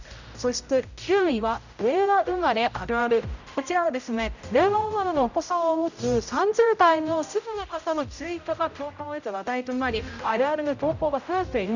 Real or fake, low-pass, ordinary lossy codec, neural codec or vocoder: fake; 7.2 kHz; none; codec, 16 kHz, 0.5 kbps, X-Codec, HuBERT features, trained on general audio